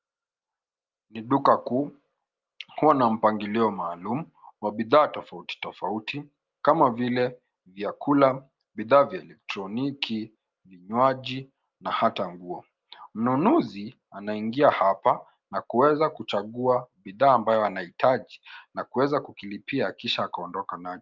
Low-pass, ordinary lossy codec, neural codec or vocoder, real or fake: 7.2 kHz; Opus, 32 kbps; none; real